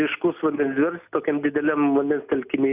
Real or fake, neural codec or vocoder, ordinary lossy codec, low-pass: real; none; Opus, 64 kbps; 3.6 kHz